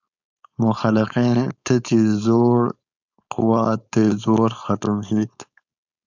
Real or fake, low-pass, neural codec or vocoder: fake; 7.2 kHz; codec, 16 kHz, 4.8 kbps, FACodec